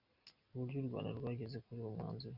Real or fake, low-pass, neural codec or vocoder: real; 5.4 kHz; none